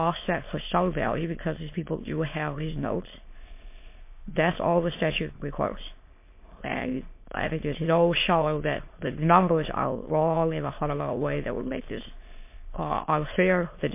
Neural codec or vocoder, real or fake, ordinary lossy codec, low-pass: autoencoder, 22.05 kHz, a latent of 192 numbers a frame, VITS, trained on many speakers; fake; MP3, 24 kbps; 3.6 kHz